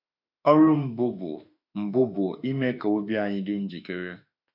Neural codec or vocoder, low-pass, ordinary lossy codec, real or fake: autoencoder, 48 kHz, 32 numbers a frame, DAC-VAE, trained on Japanese speech; 5.4 kHz; none; fake